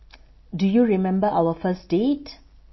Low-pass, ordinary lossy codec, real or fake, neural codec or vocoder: 7.2 kHz; MP3, 24 kbps; real; none